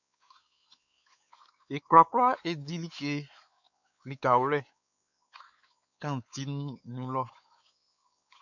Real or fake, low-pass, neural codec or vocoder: fake; 7.2 kHz; codec, 16 kHz, 4 kbps, X-Codec, WavLM features, trained on Multilingual LibriSpeech